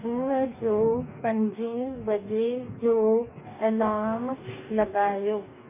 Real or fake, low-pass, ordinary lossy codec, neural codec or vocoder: fake; 3.6 kHz; none; codec, 44.1 kHz, 2.6 kbps, DAC